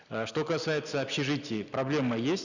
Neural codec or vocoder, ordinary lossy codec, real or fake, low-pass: none; none; real; 7.2 kHz